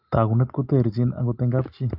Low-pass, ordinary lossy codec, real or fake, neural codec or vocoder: 5.4 kHz; Opus, 24 kbps; real; none